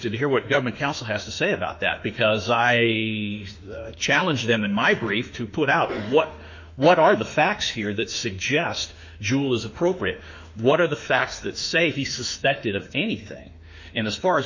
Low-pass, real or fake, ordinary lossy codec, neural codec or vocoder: 7.2 kHz; fake; MP3, 48 kbps; autoencoder, 48 kHz, 32 numbers a frame, DAC-VAE, trained on Japanese speech